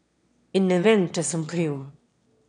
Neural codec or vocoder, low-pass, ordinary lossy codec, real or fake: autoencoder, 22.05 kHz, a latent of 192 numbers a frame, VITS, trained on one speaker; 9.9 kHz; none; fake